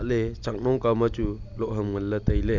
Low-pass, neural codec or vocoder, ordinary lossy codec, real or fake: 7.2 kHz; none; none; real